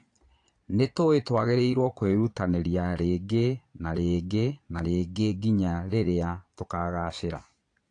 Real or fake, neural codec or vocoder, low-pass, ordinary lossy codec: fake; vocoder, 22.05 kHz, 80 mel bands, Vocos; 9.9 kHz; AAC, 48 kbps